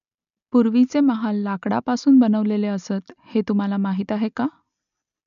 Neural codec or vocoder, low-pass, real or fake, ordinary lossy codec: none; 7.2 kHz; real; none